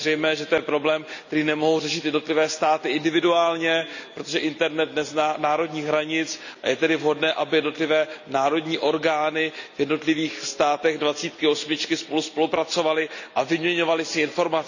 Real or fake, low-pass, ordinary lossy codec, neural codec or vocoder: real; 7.2 kHz; none; none